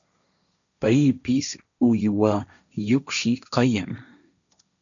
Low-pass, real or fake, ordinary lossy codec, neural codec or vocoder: 7.2 kHz; fake; MP3, 96 kbps; codec, 16 kHz, 1.1 kbps, Voila-Tokenizer